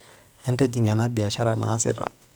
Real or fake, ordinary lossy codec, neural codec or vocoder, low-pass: fake; none; codec, 44.1 kHz, 2.6 kbps, SNAC; none